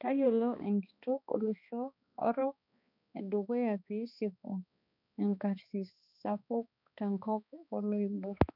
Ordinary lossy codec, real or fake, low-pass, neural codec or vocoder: none; fake; 5.4 kHz; codec, 16 kHz, 2 kbps, X-Codec, HuBERT features, trained on balanced general audio